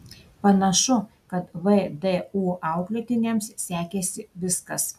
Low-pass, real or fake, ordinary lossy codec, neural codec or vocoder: 14.4 kHz; real; AAC, 96 kbps; none